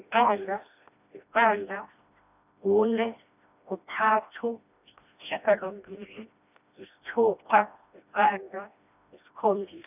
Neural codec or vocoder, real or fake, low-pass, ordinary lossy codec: codec, 16 kHz, 1 kbps, FreqCodec, smaller model; fake; 3.6 kHz; none